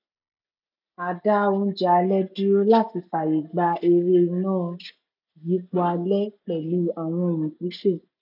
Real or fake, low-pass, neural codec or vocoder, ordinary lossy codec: real; 5.4 kHz; none; none